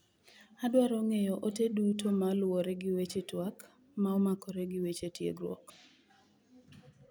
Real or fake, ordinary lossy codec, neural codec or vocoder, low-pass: real; none; none; none